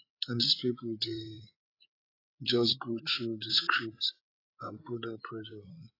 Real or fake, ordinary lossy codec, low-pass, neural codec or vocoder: fake; AAC, 24 kbps; 5.4 kHz; codec, 16 kHz, 8 kbps, FreqCodec, larger model